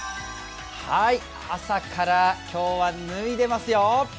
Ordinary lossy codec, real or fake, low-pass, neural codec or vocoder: none; real; none; none